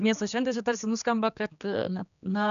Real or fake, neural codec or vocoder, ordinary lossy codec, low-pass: fake; codec, 16 kHz, 2 kbps, X-Codec, HuBERT features, trained on general audio; MP3, 96 kbps; 7.2 kHz